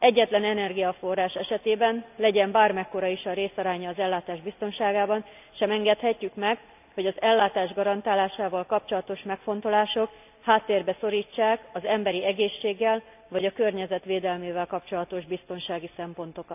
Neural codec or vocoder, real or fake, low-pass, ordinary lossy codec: none; real; 3.6 kHz; none